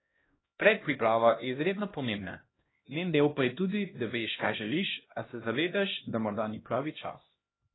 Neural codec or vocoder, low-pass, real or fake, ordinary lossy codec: codec, 16 kHz, 1 kbps, X-Codec, HuBERT features, trained on LibriSpeech; 7.2 kHz; fake; AAC, 16 kbps